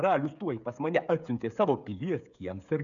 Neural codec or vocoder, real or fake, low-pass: codec, 16 kHz, 8 kbps, FreqCodec, smaller model; fake; 7.2 kHz